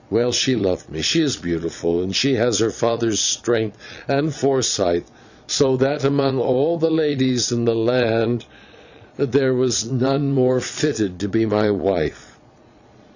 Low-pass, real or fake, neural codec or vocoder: 7.2 kHz; fake; vocoder, 22.05 kHz, 80 mel bands, Vocos